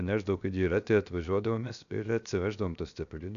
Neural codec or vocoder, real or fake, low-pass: codec, 16 kHz, 0.7 kbps, FocalCodec; fake; 7.2 kHz